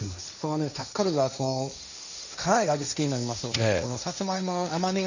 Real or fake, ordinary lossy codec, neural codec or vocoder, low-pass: fake; none; codec, 16 kHz, 1.1 kbps, Voila-Tokenizer; 7.2 kHz